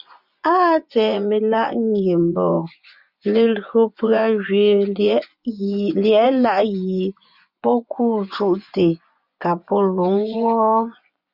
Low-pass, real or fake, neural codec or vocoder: 5.4 kHz; fake; vocoder, 24 kHz, 100 mel bands, Vocos